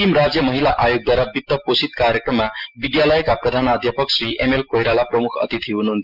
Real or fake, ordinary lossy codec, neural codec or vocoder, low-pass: real; Opus, 24 kbps; none; 5.4 kHz